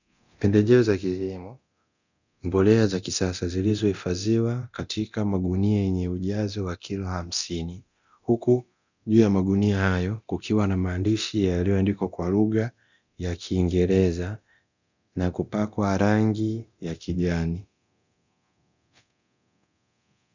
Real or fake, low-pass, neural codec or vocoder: fake; 7.2 kHz; codec, 24 kHz, 0.9 kbps, DualCodec